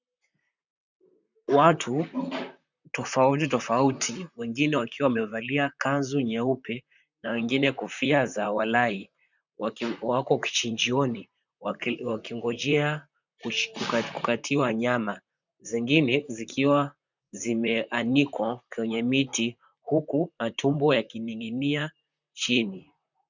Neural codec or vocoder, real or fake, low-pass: vocoder, 44.1 kHz, 128 mel bands, Pupu-Vocoder; fake; 7.2 kHz